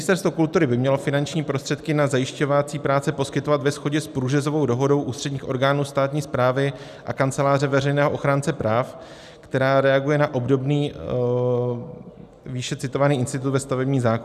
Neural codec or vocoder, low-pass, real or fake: none; 14.4 kHz; real